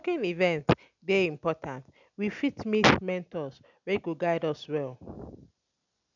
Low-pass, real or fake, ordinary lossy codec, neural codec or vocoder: 7.2 kHz; real; none; none